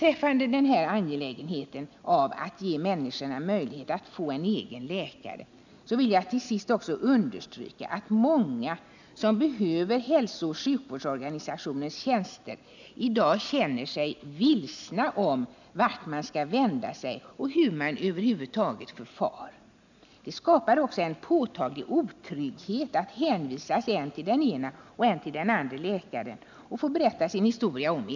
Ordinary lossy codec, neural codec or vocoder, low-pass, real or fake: none; none; 7.2 kHz; real